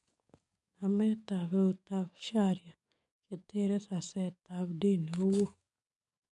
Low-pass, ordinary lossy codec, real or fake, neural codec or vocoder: 10.8 kHz; MP3, 64 kbps; fake; codec, 44.1 kHz, 7.8 kbps, DAC